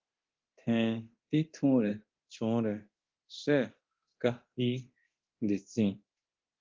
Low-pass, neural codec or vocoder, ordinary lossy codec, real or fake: 7.2 kHz; codec, 24 kHz, 0.9 kbps, DualCodec; Opus, 32 kbps; fake